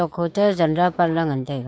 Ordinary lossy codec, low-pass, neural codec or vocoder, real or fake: none; none; codec, 16 kHz, 6 kbps, DAC; fake